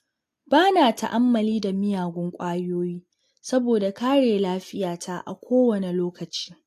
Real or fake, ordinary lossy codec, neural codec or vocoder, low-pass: real; AAC, 64 kbps; none; 14.4 kHz